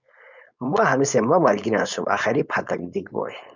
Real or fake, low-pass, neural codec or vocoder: fake; 7.2 kHz; codec, 16 kHz, 4.8 kbps, FACodec